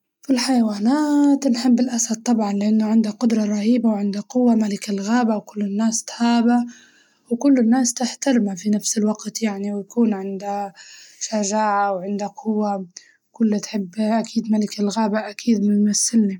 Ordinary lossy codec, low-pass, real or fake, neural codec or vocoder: none; 19.8 kHz; real; none